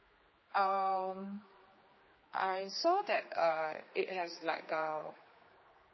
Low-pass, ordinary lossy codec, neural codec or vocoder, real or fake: 7.2 kHz; MP3, 24 kbps; codec, 16 kHz, 2 kbps, X-Codec, HuBERT features, trained on general audio; fake